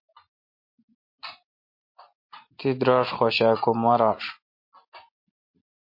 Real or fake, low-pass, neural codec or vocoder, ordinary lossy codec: real; 5.4 kHz; none; MP3, 32 kbps